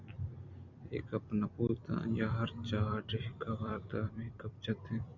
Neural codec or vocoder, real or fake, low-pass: vocoder, 44.1 kHz, 128 mel bands every 256 samples, BigVGAN v2; fake; 7.2 kHz